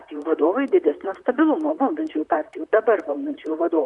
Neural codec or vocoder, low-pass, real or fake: vocoder, 44.1 kHz, 128 mel bands, Pupu-Vocoder; 10.8 kHz; fake